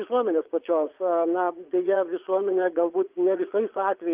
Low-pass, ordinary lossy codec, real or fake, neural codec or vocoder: 3.6 kHz; Opus, 32 kbps; fake; vocoder, 22.05 kHz, 80 mel bands, Vocos